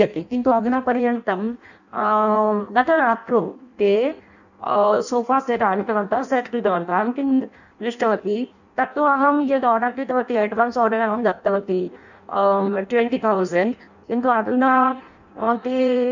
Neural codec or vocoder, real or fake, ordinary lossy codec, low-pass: codec, 16 kHz in and 24 kHz out, 0.6 kbps, FireRedTTS-2 codec; fake; none; 7.2 kHz